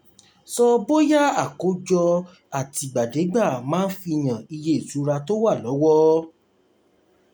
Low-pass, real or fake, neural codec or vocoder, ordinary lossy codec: none; real; none; none